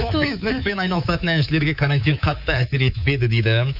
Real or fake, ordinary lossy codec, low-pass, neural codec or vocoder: fake; none; 5.4 kHz; codec, 24 kHz, 3.1 kbps, DualCodec